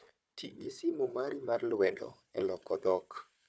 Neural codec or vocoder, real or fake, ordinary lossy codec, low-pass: codec, 16 kHz, 4 kbps, FunCodec, trained on Chinese and English, 50 frames a second; fake; none; none